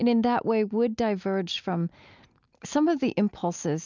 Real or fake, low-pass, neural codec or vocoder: real; 7.2 kHz; none